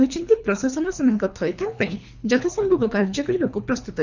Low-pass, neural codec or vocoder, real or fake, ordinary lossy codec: 7.2 kHz; codec, 24 kHz, 3 kbps, HILCodec; fake; none